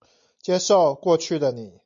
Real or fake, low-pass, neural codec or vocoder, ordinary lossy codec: real; 7.2 kHz; none; MP3, 48 kbps